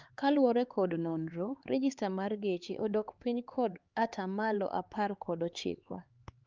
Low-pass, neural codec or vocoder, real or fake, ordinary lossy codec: 7.2 kHz; codec, 16 kHz, 4 kbps, X-Codec, HuBERT features, trained on LibriSpeech; fake; Opus, 24 kbps